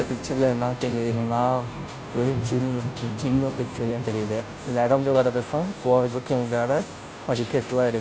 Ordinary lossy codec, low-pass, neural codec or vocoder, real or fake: none; none; codec, 16 kHz, 0.5 kbps, FunCodec, trained on Chinese and English, 25 frames a second; fake